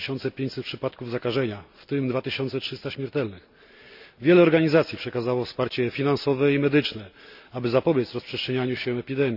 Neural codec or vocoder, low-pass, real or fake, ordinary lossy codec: none; 5.4 kHz; real; none